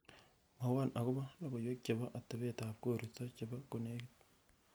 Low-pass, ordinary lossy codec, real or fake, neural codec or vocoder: none; none; real; none